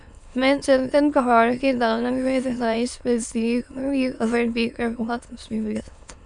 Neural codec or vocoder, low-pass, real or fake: autoencoder, 22.05 kHz, a latent of 192 numbers a frame, VITS, trained on many speakers; 9.9 kHz; fake